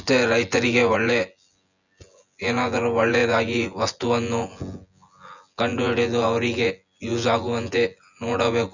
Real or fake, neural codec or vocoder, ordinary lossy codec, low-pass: fake; vocoder, 24 kHz, 100 mel bands, Vocos; none; 7.2 kHz